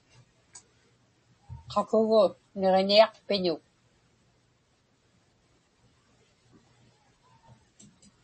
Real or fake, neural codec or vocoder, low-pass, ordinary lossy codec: real; none; 9.9 kHz; MP3, 32 kbps